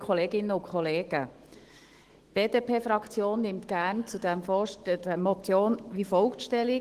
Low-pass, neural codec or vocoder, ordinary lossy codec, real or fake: 14.4 kHz; autoencoder, 48 kHz, 128 numbers a frame, DAC-VAE, trained on Japanese speech; Opus, 16 kbps; fake